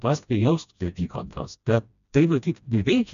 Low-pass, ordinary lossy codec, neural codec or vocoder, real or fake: 7.2 kHz; MP3, 96 kbps; codec, 16 kHz, 1 kbps, FreqCodec, smaller model; fake